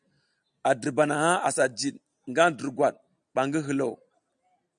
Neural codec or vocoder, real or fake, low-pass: none; real; 9.9 kHz